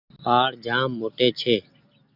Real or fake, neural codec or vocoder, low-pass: real; none; 5.4 kHz